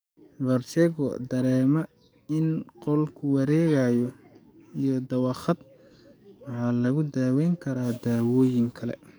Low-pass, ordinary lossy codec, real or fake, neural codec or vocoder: none; none; fake; codec, 44.1 kHz, 7.8 kbps, DAC